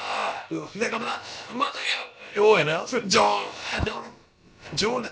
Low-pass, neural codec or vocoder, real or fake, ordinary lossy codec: none; codec, 16 kHz, about 1 kbps, DyCAST, with the encoder's durations; fake; none